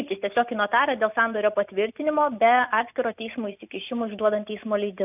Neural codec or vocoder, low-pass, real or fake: none; 3.6 kHz; real